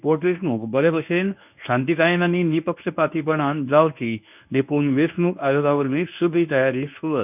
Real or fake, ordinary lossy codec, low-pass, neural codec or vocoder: fake; none; 3.6 kHz; codec, 24 kHz, 0.9 kbps, WavTokenizer, medium speech release version 1